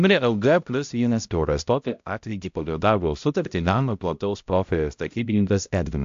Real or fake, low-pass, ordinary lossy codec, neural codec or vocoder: fake; 7.2 kHz; AAC, 64 kbps; codec, 16 kHz, 0.5 kbps, X-Codec, HuBERT features, trained on balanced general audio